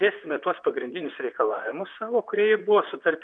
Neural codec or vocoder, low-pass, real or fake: vocoder, 44.1 kHz, 128 mel bands, Pupu-Vocoder; 9.9 kHz; fake